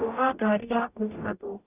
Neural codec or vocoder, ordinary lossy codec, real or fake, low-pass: codec, 44.1 kHz, 0.9 kbps, DAC; AAC, 24 kbps; fake; 3.6 kHz